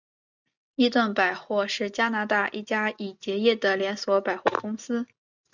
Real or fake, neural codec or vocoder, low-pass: real; none; 7.2 kHz